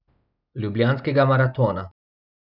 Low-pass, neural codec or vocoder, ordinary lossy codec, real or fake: 5.4 kHz; none; none; real